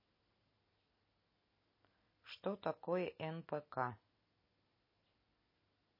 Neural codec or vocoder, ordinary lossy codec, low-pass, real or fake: none; MP3, 24 kbps; 5.4 kHz; real